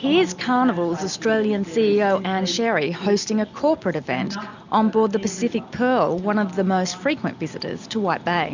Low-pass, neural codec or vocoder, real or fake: 7.2 kHz; none; real